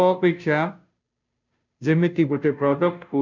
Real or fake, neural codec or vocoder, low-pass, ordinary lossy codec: fake; codec, 16 kHz, 0.5 kbps, FunCodec, trained on Chinese and English, 25 frames a second; 7.2 kHz; none